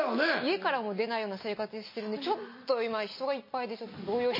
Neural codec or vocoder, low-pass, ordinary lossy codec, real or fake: none; 5.4 kHz; MP3, 24 kbps; real